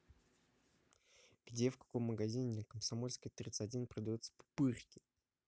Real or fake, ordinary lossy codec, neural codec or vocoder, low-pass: real; none; none; none